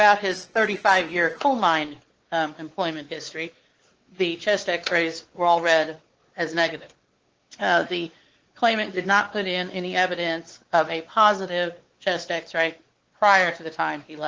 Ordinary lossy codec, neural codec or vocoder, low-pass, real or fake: Opus, 16 kbps; codec, 16 kHz, 4 kbps, X-Codec, WavLM features, trained on Multilingual LibriSpeech; 7.2 kHz; fake